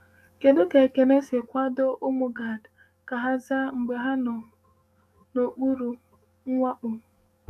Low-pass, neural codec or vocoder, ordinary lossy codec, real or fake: 14.4 kHz; codec, 44.1 kHz, 7.8 kbps, DAC; none; fake